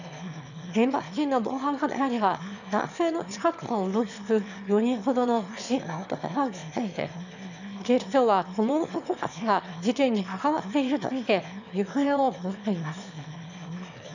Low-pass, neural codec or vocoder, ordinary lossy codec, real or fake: 7.2 kHz; autoencoder, 22.05 kHz, a latent of 192 numbers a frame, VITS, trained on one speaker; none; fake